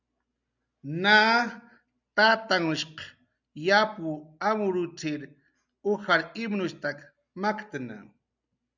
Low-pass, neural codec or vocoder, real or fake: 7.2 kHz; none; real